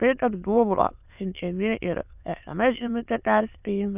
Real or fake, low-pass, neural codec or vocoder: fake; 3.6 kHz; autoencoder, 22.05 kHz, a latent of 192 numbers a frame, VITS, trained on many speakers